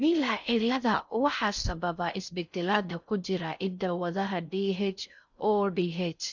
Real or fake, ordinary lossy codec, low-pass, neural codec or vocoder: fake; none; 7.2 kHz; codec, 16 kHz in and 24 kHz out, 0.6 kbps, FocalCodec, streaming, 4096 codes